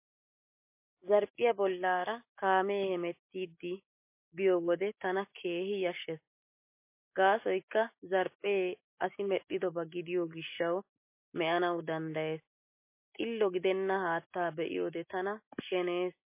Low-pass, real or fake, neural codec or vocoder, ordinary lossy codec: 3.6 kHz; real; none; MP3, 24 kbps